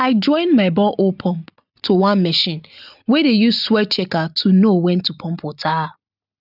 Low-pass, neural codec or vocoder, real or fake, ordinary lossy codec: 5.4 kHz; none; real; none